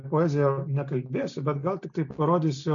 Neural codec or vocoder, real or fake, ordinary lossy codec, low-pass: none; real; MP3, 64 kbps; 7.2 kHz